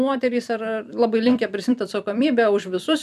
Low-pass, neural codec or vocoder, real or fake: 14.4 kHz; vocoder, 44.1 kHz, 128 mel bands every 256 samples, BigVGAN v2; fake